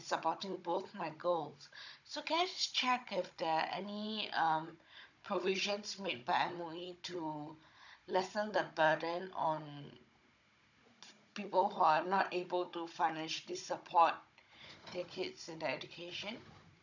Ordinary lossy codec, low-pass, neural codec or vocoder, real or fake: none; 7.2 kHz; codec, 16 kHz, 16 kbps, FunCodec, trained on Chinese and English, 50 frames a second; fake